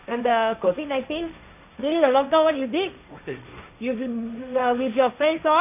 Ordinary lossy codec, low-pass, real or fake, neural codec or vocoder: none; 3.6 kHz; fake; codec, 16 kHz, 1.1 kbps, Voila-Tokenizer